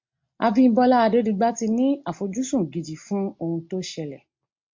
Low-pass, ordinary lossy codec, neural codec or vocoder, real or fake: 7.2 kHz; MP3, 48 kbps; none; real